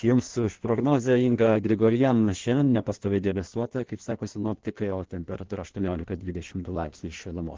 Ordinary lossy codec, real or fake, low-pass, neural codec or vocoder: Opus, 16 kbps; fake; 7.2 kHz; codec, 16 kHz in and 24 kHz out, 1.1 kbps, FireRedTTS-2 codec